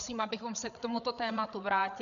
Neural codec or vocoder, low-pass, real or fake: codec, 16 kHz, 8 kbps, FreqCodec, larger model; 7.2 kHz; fake